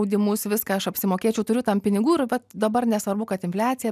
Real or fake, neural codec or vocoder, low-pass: real; none; 14.4 kHz